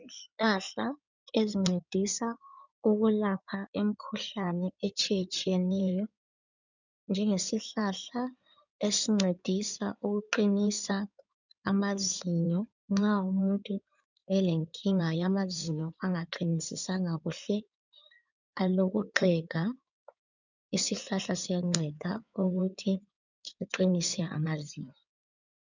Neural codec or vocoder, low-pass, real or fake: codec, 16 kHz in and 24 kHz out, 2.2 kbps, FireRedTTS-2 codec; 7.2 kHz; fake